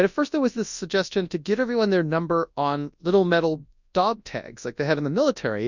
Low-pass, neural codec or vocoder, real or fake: 7.2 kHz; codec, 24 kHz, 0.9 kbps, WavTokenizer, large speech release; fake